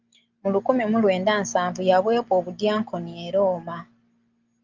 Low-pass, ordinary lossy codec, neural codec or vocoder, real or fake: 7.2 kHz; Opus, 32 kbps; none; real